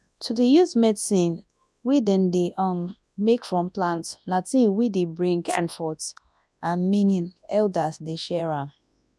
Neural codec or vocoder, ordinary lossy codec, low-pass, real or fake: codec, 24 kHz, 0.9 kbps, WavTokenizer, large speech release; none; none; fake